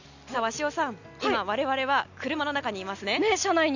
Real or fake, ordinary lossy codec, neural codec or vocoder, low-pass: real; none; none; 7.2 kHz